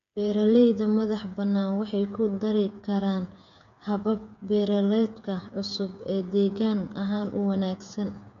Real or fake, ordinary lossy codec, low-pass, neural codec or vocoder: fake; none; 7.2 kHz; codec, 16 kHz, 8 kbps, FreqCodec, smaller model